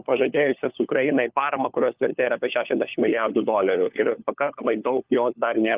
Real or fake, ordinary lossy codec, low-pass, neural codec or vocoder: fake; Opus, 24 kbps; 3.6 kHz; codec, 16 kHz, 4 kbps, FunCodec, trained on LibriTTS, 50 frames a second